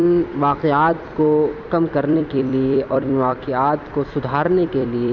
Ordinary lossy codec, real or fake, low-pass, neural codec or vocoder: none; fake; 7.2 kHz; vocoder, 44.1 kHz, 128 mel bands every 256 samples, BigVGAN v2